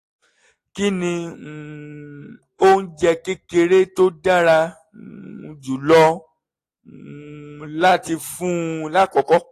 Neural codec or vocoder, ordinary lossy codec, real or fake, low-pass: none; AAC, 48 kbps; real; 14.4 kHz